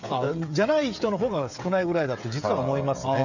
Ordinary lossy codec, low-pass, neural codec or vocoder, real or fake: none; 7.2 kHz; codec, 16 kHz, 16 kbps, FreqCodec, smaller model; fake